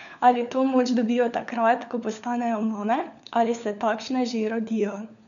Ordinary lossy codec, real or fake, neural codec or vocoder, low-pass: none; fake; codec, 16 kHz, 4 kbps, FunCodec, trained on LibriTTS, 50 frames a second; 7.2 kHz